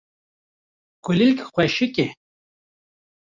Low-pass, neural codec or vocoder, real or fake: 7.2 kHz; none; real